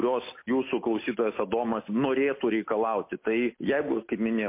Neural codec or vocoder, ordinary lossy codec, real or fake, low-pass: none; MP3, 24 kbps; real; 3.6 kHz